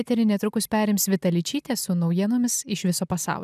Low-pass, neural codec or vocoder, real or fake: 14.4 kHz; none; real